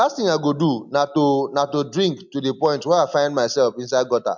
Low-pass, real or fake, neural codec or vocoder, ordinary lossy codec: 7.2 kHz; real; none; MP3, 64 kbps